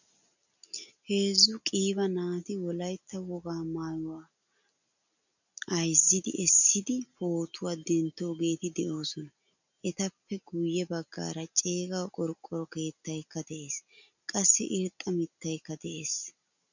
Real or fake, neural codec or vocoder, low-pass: real; none; 7.2 kHz